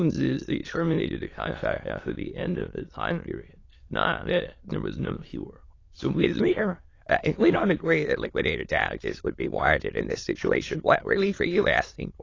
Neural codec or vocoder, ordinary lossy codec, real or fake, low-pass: autoencoder, 22.05 kHz, a latent of 192 numbers a frame, VITS, trained on many speakers; AAC, 32 kbps; fake; 7.2 kHz